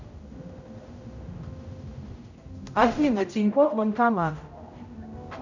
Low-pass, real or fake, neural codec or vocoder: 7.2 kHz; fake; codec, 16 kHz, 0.5 kbps, X-Codec, HuBERT features, trained on general audio